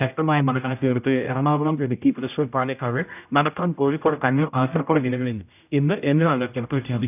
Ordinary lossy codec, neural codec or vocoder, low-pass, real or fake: none; codec, 16 kHz, 0.5 kbps, X-Codec, HuBERT features, trained on general audio; 3.6 kHz; fake